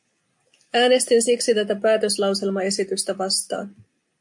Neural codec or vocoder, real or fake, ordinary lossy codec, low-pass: none; real; MP3, 64 kbps; 10.8 kHz